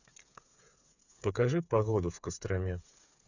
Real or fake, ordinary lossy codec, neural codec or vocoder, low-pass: fake; none; codec, 16 kHz, 8 kbps, FreqCodec, smaller model; 7.2 kHz